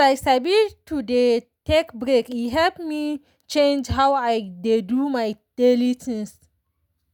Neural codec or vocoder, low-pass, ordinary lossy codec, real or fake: none; 19.8 kHz; none; real